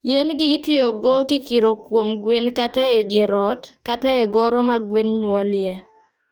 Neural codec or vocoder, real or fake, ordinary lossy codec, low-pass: codec, 44.1 kHz, 2.6 kbps, DAC; fake; none; none